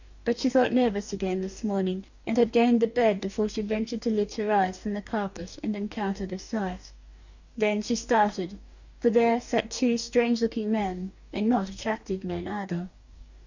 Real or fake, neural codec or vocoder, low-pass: fake; codec, 44.1 kHz, 2.6 kbps, DAC; 7.2 kHz